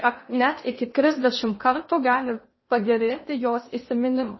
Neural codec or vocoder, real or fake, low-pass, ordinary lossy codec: codec, 16 kHz in and 24 kHz out, 0.8 kbps, FocalCodec, streaming, 65536 codes; fake; 7.2 kHz; MP3, 24 kbps